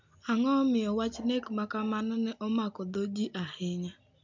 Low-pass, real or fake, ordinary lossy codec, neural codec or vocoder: 7.2 kHz; real; MP3, 64 kbps; none